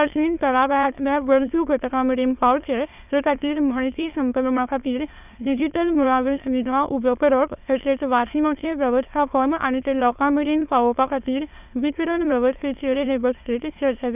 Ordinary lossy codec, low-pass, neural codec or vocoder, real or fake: none; 3.6 kHz; autoencoder, 22.05 kHz, a latent of 192 numbers a frame, VITS, trained on many speakers; fake